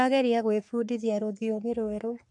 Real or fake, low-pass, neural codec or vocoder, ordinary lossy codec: fake; 10.8 kHz; codec, 44.1 kHz, 3.4 kbps, Pupu-Codec; MP3, 64 kbps